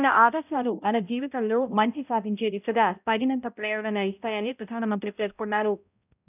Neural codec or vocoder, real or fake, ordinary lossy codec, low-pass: codec, 16 kHz, 0.5 kbps, X-Codec, HuBERT features, trained on balanced general audio; fake; none; 3.6 kHz